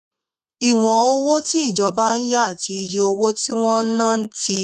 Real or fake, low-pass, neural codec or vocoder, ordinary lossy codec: fake; 14.4 kHz; codec, 32 kHz, 1.9 kbps, SNAC; none